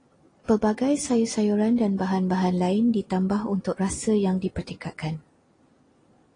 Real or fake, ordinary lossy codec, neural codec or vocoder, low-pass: real; AAC, 32 kbps; none; 9.9 kHz